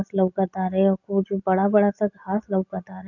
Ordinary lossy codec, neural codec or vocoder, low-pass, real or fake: none; none; 7.2 kHz; real